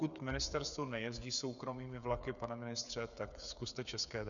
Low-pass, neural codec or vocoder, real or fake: 7.2 kHz; codec, 16 kHz, 8 kbps, FreqCodec, smaller model; fake